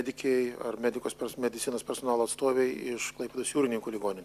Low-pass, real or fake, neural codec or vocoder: 14.4 kHz; real; none